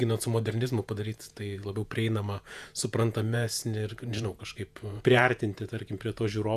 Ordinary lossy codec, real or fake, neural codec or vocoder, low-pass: AAC, 96 kbps; fake; vocoder, 48 kHz, 128 mel bands, Vocos; 14.4 kHz